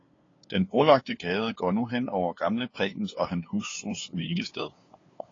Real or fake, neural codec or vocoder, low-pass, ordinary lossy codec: fake; codec, 16 kHz, 2 kbps, FunCodec, trained on LibriTTS, 25 frames a second; 7.2 kHz; AAC, 32 kbps